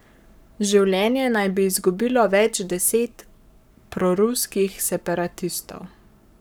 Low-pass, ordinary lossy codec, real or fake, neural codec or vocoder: none; none; fake; codec, 44.1 kHz, 7.8 kbps, Pupu-Codec